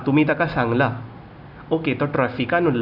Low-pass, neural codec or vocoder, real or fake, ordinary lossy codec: 5.4 kHz; none; real; none